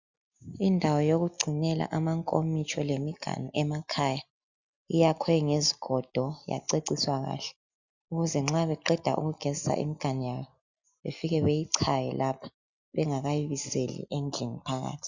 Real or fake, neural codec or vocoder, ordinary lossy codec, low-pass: real; none; Opus, 64 kbps; 7.2 kHz